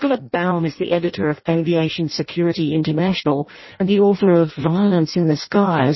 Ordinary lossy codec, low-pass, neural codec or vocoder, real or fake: MP3, 24 kbps; 7.2 kHz; codec, 16 kHz in and 24 kHz out, 0.6 kbps, FireRedTTS-2 codec; fake